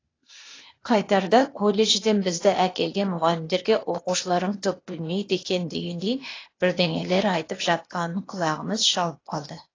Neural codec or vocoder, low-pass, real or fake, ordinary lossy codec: codec, 16 kHz, 0.8 kbps, ZipCodec; 7.2 kHz; fake; AAC, 32 kbps